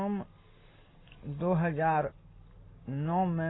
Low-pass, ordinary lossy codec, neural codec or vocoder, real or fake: 7.2 kHz; AAC, 16 kbps; none; real